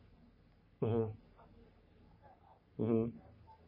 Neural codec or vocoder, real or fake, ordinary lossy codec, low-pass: codec, 44.1 kHz, 3.4 kbps, Pupu-Codec; fake; MP3, 24 kbps; 5.4 kHz